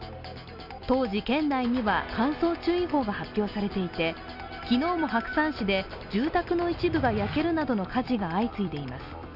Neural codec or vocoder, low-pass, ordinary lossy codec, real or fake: none; 5.4 kHz; none; real